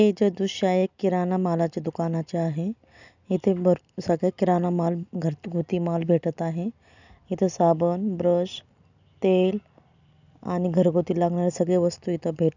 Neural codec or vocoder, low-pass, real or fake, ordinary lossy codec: none; 7.2 kHz; real; none